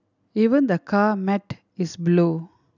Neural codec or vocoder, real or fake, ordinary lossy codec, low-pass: none; real; none; 7.2 kHz